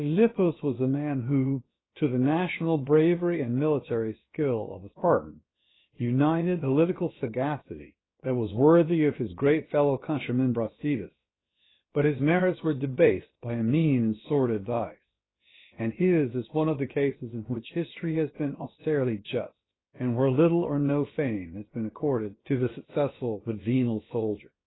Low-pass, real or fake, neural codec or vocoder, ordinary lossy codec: 7.2 kHz; fake; codec, 16 kHz, about 1 kbps, DyCAST, with the encoder's durations; AAC, 16 kbps